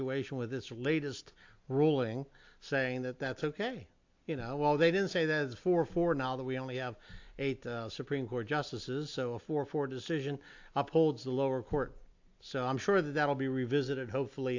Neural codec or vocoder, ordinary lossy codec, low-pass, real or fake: none; AAC, 48 kbps; 7.2 kHz; real